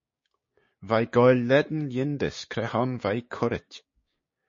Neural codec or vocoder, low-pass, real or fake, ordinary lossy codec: codec, 16 kHz, 6 kbps, DAC; 7.2 kHz; fake; MP3, 32 kbps